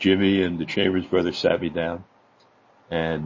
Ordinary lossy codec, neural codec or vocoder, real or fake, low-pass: MP3, 32 kbps; none; real; 7.2 kHz